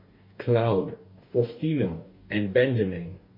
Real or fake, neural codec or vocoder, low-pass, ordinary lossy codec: fake; codec, 44.1 kHz, 2.6 kbps, DAC; 5.4 kHz; MP3, 32 kbps